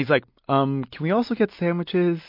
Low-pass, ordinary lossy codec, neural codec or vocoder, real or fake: 5.4 kHz; MP3, 32 kbps; none; real